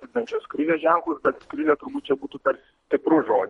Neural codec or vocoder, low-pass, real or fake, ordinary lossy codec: codec, 24 kHz, 3 kbps, HILCodec; 10.8 kHz; fake; MP3, 48 kbps